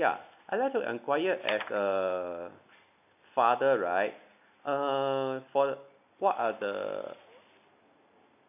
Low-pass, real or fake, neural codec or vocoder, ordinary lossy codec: 3.6 kHz; real; none; none